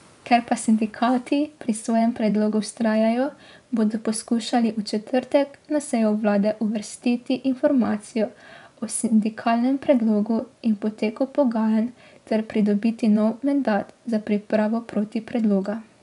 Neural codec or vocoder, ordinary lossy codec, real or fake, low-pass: vocoder, 24 kHz, 100 mel bands, Vocos; none; fake; 10.8 kHz